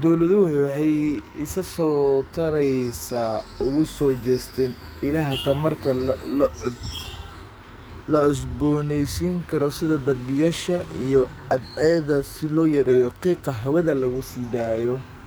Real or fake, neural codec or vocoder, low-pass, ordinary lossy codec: fake; codec, 44.1 kHz, 2.6 kbps, SNAC; none; none